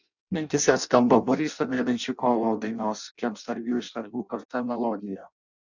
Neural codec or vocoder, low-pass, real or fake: codec, 16 kHz in and 24 kHz out, 0.6 kbps, FireRedTTS-2 codec; 7.2 kHz; fake